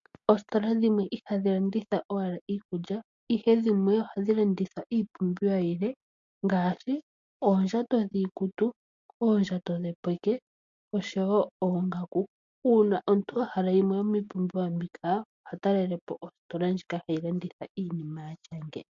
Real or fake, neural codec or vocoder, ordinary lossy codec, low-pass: real; none; MP3, 48 kbps; 7.2 kHz